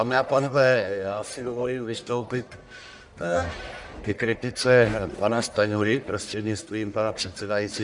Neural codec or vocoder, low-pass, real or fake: codec, 44.1 kHz, 1.7 kbps, Pupu-Codec; 10.8 kHz; fake